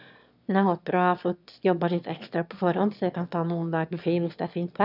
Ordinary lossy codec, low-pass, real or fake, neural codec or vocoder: none; 5.4 kHz; fake; autoencoder, 22.05 kHz, a latent of 192 numbers a frame, VITS, trained on one speaker